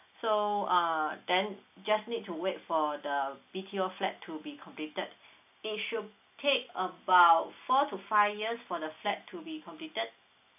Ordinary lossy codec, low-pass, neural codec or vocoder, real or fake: AAC, 32 kbps; 3.6 kHz; none; real